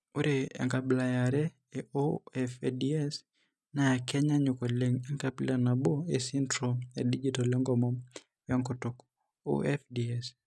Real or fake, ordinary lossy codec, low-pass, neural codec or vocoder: real; none; none; none